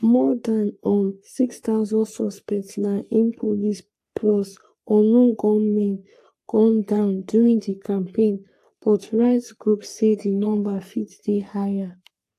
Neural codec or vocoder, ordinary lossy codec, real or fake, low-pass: codec, 44.1 kHz, 3.4 kbps, Pupu-Codec; AAC, 64 kbps; fake; 14.4 kHz